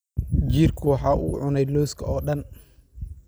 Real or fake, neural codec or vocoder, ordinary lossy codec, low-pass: real; none; none; none